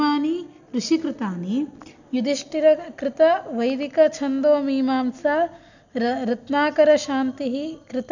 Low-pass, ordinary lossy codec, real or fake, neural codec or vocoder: 7.2 kHz; none; real; none